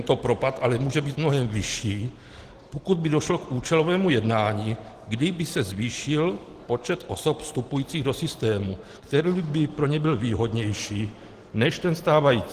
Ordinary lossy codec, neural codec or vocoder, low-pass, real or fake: Opus, 16 kbps; none; 14.4 kHz; real